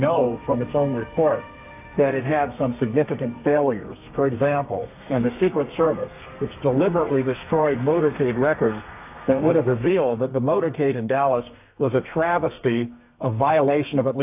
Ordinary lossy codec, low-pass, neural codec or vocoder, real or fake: AAC, 32 kbps; 3.6 kHz; codec, 32 kHz, 1.9 kbps, SNAC; fake